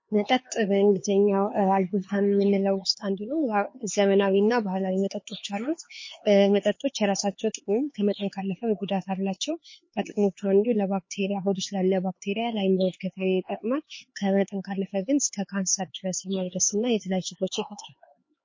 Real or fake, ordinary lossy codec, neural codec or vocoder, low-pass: fake; MP3, 32 kbps; codec, 16 kHz, 4 kbps, X-Codec, HuBERT features, trained on LibriSpeech; 7.2 kHz